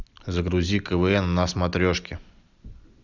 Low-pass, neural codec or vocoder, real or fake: 7.2 kHz; none; real